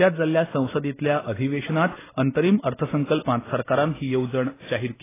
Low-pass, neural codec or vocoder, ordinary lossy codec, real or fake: 3.6 kHz; none; AAC, 16 kbps; real